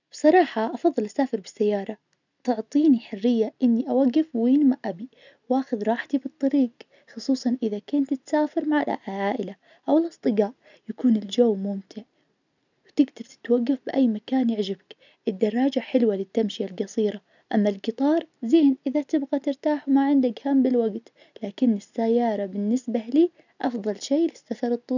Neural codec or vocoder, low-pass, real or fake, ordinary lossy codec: none; 7.2 kHz; real; none